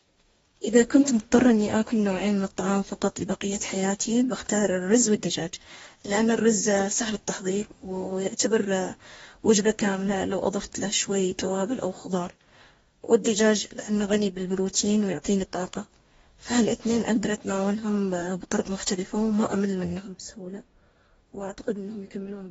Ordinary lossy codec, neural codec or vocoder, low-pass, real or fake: AAC, 24 kbps; codec, 44.1 kHz, 2.6 kbps, DAC; 19.8 kHz; fake